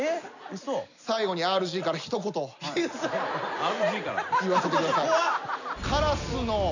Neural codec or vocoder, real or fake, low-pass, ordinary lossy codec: none; real; 7.2 kHz; none